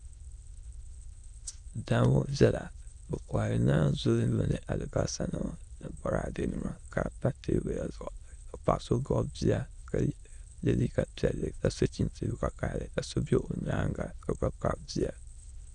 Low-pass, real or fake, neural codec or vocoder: 9.9 kHz; fake; autoencoder, 22.05 kHz, a latent of 192 numbers a frame, VITS, trained on many speakers